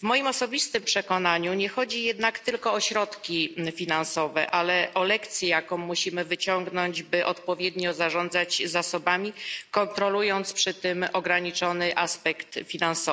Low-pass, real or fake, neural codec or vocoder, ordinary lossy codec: none; real; none; none